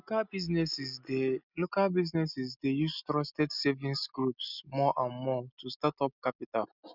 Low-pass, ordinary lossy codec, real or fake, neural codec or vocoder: 5.4 kHz; none; real; none